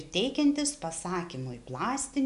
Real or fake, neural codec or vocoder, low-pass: real; none; 10.8 kHz